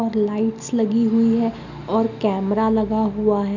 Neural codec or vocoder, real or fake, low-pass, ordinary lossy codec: none; real; 7.2 kHz; none